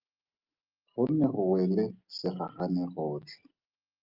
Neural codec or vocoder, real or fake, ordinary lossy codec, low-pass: none; real; Opus, 24 kbps; 5.4 kHz